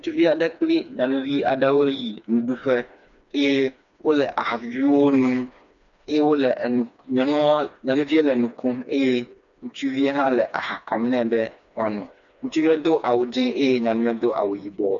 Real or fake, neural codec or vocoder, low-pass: fake; codec, 16 kHz, 2 kbps, FreqCodec, smaller model; 7.2 kHz